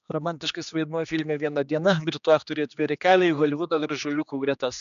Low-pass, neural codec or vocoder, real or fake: 7.2 kHz; codec, 16 kHz, 2 kbps, X-Codec, HuBERT features, trained on general audio; fake